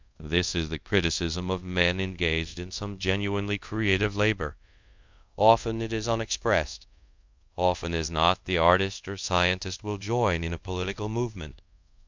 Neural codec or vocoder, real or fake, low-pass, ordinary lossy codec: codec, 24 kHz, 0.5 kbps, DualCodec; fake; 7.2 kHz; MP3, 64 kbps